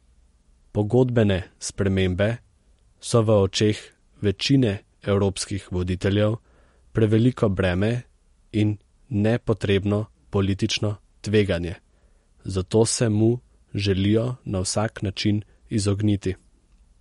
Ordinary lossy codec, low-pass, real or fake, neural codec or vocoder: MP3, 48 kbps; 19.8 kHz; real; none